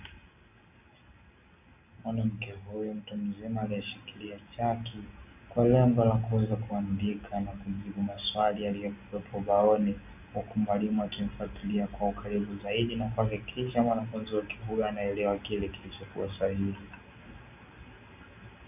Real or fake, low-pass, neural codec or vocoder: real; 3.6 kHz; none